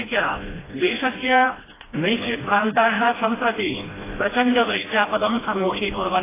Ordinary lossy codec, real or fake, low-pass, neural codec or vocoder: AAC, 16 kbps; fake; 3.6 kHz; codec, 16 kHz, 1 kbps, FreqCodec, smaller model